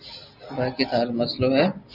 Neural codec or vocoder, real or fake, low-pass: none; real; 5.4 kHz